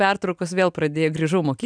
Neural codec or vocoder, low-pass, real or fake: none; 9.9 kHz; real